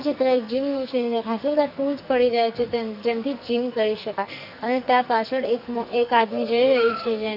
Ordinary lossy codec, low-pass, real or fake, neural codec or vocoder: none; 5.4 kHz; fake; codec, 44.1 kHz, 2.6 kbps, SNAC